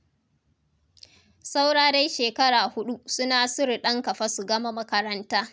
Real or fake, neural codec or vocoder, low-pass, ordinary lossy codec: real; none; none; none